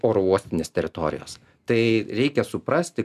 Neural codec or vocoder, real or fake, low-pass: none; real; 14.4 kHz